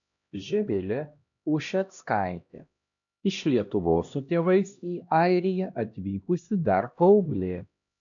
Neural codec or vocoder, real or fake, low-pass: codec, 16 kHz, 1 kbps, X-Codec, HuBERT features, trained on LibriSpeech; fake; 7.2 kHz